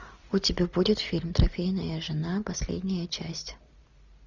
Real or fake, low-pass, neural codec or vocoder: real; 7.2 kHz; none